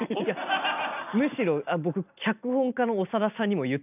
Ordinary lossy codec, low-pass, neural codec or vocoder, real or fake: none; 3.6 kHz; none; real